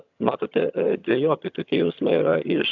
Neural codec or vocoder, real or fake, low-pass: vocoder, 22.05 kHz, 80 mel bands, HiFi-GAN; fake; 7.2 kHz